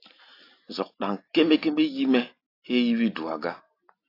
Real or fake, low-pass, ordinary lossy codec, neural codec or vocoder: real; 5.4 kHz; AAC, 32 kbps; none